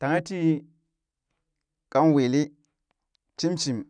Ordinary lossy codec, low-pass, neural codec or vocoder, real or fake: none; 9.9 kHz; none; real